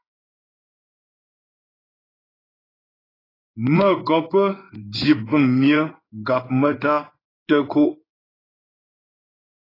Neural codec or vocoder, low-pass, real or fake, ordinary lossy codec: codec, 16 kHz in and 24 kHz out, 1 kbps, XY-Tokenizer; 5.4 kHz; fake; AAC, 24 kbps